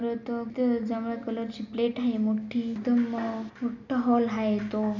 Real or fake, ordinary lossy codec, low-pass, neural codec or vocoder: real; none; 7.2 kHz; none